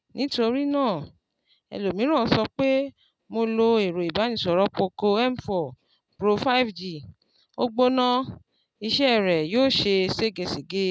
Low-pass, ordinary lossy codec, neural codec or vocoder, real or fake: none; none; none; real